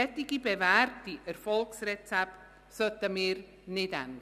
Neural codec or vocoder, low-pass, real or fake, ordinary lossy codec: none; 14.4 kHz; real; none